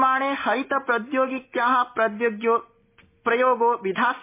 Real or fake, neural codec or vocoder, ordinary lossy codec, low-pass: real; none; MP3, 24 kbps; 3.6 kHz